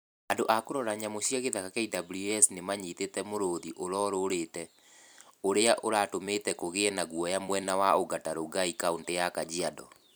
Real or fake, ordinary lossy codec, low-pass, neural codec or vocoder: fake; none; none; vocoder, 44.1 kHz, 128 mel bands every 256 samples, BigVGAN v2